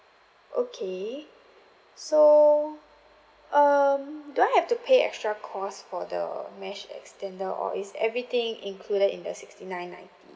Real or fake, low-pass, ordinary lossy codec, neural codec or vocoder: real; none; none; none